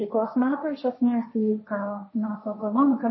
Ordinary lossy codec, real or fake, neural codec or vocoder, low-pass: MP3, 24 kbps; fake; codec, 16 kHz, 1.1 kbps, Voila-Tokenizer; 7.2 kHz